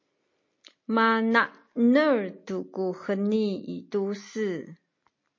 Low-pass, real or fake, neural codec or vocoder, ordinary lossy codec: 7.2 kHz; real; none; MP3, 32 kbps